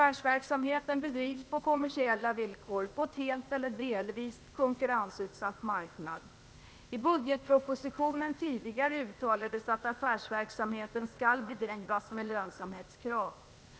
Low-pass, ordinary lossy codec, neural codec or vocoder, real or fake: none; none; codec, 16 kHz, 0.8 kbps, ZipCodec; fake